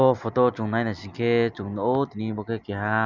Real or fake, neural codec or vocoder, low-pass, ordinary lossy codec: real; none; 7.2 kHz; none